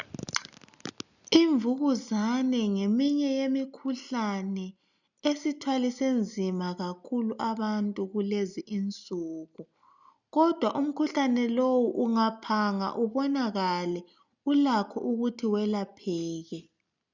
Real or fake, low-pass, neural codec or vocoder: real; 7.2 kHz; none